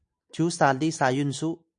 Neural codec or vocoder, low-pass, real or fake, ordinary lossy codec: none; 9.9 kHz; real; AAC, 64 kbps